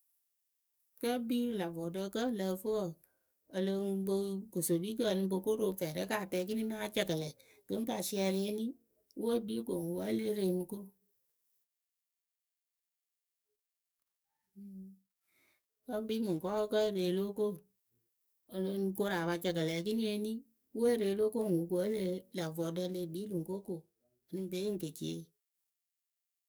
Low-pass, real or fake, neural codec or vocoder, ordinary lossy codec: none; fake; codec, 44.1 kHz, 7.8 kbps, DAC; none